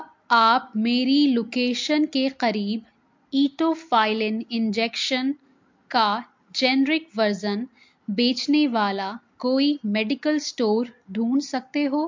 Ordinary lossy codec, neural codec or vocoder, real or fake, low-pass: MP3, 48 kbps; none; real; 7.2 kHz